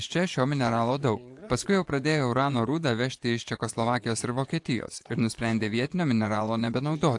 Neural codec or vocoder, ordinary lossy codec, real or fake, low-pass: vocoder, 24 kHz, 100 mel bands, Vocos; AAC, 64 kbps; fake; 10.8 kHz